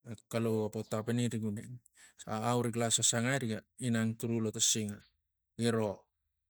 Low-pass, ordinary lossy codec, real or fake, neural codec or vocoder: none; none; real; none